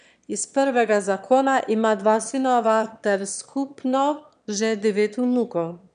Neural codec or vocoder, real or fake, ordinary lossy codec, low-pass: autoencoder, 22.05 kHz, a latent of 192 numbers a frame, VITS, trained on one speaker; fake; none; 9.9 kHz